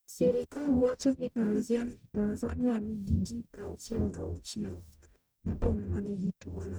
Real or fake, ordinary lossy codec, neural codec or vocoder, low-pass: fake; none; codec, 44.1 kHz, 0.9 kbps, DAC; none